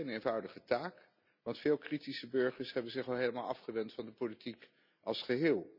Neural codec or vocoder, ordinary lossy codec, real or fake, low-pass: none; none; real; 5.4 kHz